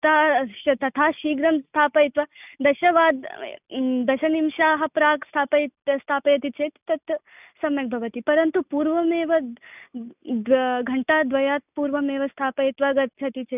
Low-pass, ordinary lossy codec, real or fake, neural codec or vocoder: 3.6 kHz; none; real; none